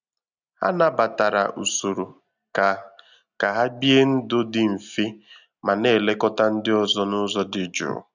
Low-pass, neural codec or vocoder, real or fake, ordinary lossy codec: 7.2 kHz; none; real; none